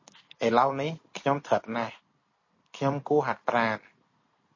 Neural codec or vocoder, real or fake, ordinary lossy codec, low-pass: none; real; MP3, 32 kbps; 7.2 kHz